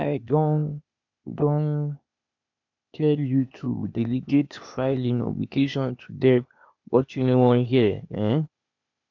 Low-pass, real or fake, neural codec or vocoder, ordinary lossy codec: 7.2 kHz; fake; codec, 16 kHz, 0.8 kbps, ZipCodec; none